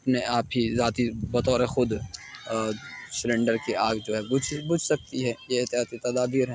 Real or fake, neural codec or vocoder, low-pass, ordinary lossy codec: real; none; none; none